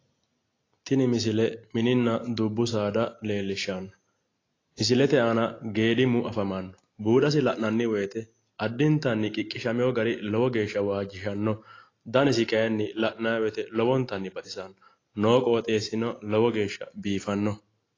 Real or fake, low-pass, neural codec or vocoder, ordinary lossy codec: real; 7.2 kHz; none; AAC, 32 kbps